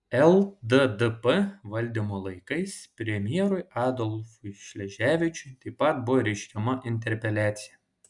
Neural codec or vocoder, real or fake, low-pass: none; real; 10.8 kHz